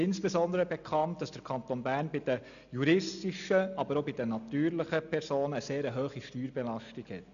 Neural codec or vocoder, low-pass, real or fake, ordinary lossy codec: none; 7.2 kHz; real; none